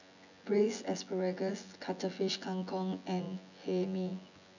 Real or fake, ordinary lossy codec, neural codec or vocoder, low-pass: fake; none; vocoder, 24 kHz, 100 mel bands, Vocos; 7.2 kHz